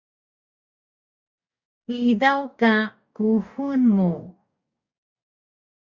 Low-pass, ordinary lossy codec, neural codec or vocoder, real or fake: 7.2 kHz; Opus, 64 kbps; codec, 44.1 kHz, 2.6 kbps, DAC; fake